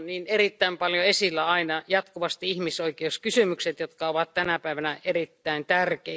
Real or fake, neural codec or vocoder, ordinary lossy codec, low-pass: real; none; none; none